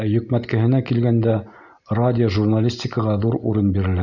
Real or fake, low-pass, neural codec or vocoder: real; 7.2 kHz; none